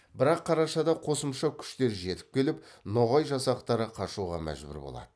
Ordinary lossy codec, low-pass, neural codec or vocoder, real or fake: none; none; none; real